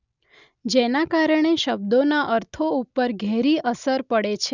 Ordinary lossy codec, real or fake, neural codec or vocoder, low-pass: none; real; none; 7.2 kHz